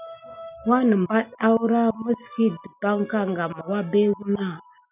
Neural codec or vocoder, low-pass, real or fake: none; 3.6 kHz; real